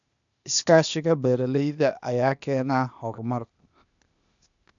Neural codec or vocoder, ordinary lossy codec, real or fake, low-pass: codec, 16 kHz, 0.8 kbps, ZipCodec; none; fake; 7.2 kHz